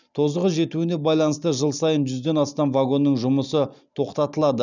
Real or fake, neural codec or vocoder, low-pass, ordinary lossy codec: real; none; 7.2 kHz; none